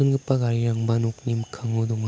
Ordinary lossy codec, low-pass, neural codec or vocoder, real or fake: none; none; none; real